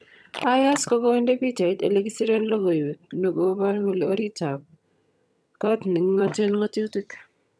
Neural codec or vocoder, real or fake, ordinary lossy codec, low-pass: vocoder, 22.05 kHz, 80 mel bands, HiFi-GAN; fake; none; none